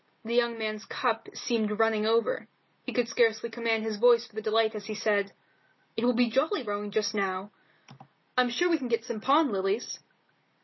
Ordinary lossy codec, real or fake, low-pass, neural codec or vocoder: MP3, 24 kbps; real; 7.2 kHz; none